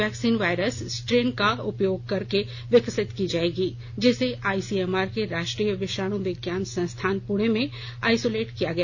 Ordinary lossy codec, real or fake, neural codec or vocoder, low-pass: none; real; none; 7.2 kHz